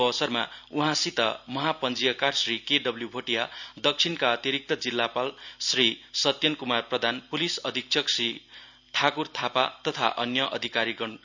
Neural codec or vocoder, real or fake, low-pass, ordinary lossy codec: none; real; 7.2 kHz; none